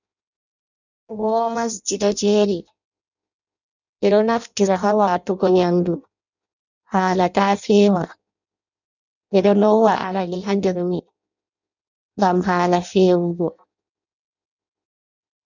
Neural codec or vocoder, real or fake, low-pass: codec, 16 kHz in and 24 kHz out, 0.6 kbps, FireRedTTS-2 codec; fake; 7.2 kHz